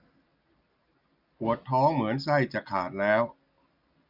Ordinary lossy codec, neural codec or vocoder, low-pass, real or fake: none; none; 5.4 kHz; real